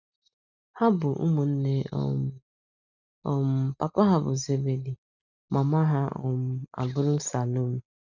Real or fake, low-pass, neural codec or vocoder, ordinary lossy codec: real; 7.2 kHz; none; none